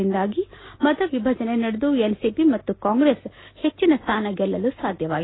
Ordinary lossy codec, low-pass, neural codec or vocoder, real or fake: AAC, 16 kbps; 7.2 kHz; none; real